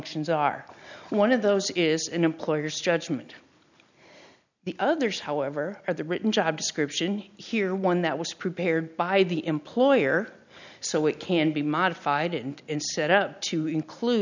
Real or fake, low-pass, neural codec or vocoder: real; 7.2 kHz; none